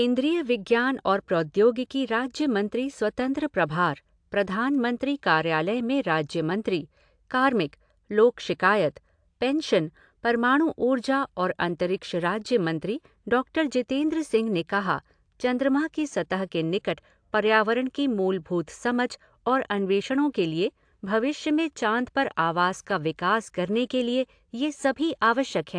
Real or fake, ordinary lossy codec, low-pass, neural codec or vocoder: real; AAC, 64 kbps; 9.9 kHz; none